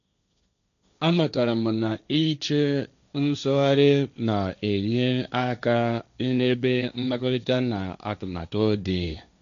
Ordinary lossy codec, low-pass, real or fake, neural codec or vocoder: MP3, 96 kbps; 7.2 kHz; fake; codec, 16 kHz, 1.1 kbps, Voila-Tokenizer